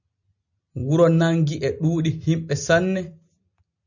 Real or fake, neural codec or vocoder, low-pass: real; none; 7.2 kHz